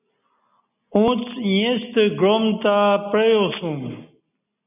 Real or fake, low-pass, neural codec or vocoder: real; 3.6 kHz; none